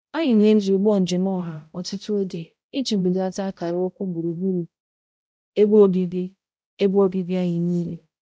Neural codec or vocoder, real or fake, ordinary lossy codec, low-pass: codec, 16 kHz, 0.5 kbps, X-Codec, HuBERT features, trained on balanced general audio; fake; none; none